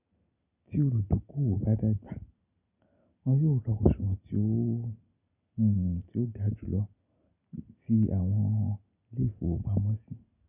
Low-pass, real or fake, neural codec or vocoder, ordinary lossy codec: 3.6 kHz; real; none; none